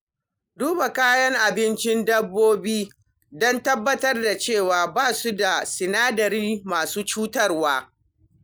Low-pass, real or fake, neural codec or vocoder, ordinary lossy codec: none; real; none; none